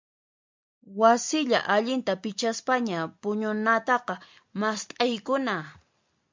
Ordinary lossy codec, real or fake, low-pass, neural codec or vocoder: MP3, 64 kbps; real; 7.2 kHz; none